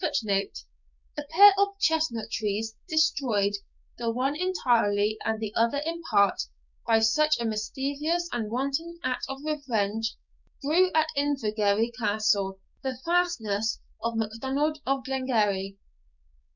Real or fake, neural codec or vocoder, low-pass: fake; codec, 16 kHz, 6 kbps, DAC; 7.2 kHz